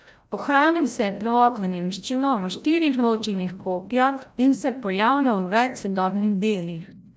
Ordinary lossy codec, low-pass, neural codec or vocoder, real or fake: none; none; codec, 16 kHz, 0.5 kbps, FreqCodec, larger model; fake